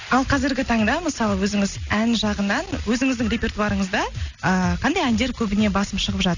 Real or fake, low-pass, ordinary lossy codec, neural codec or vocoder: real; 7.2 kHz; none; none